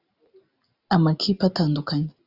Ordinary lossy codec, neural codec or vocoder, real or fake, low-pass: Opus, 64 kbps; none; real; 5.4 kHz